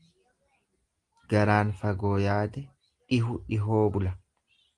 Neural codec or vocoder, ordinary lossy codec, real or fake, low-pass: none; Opus, 24 kbps; real; 10.8 kHz